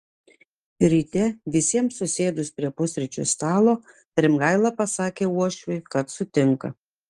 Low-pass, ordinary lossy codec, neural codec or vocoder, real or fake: 10.8 kHz; Opus, 24 kbps; none; real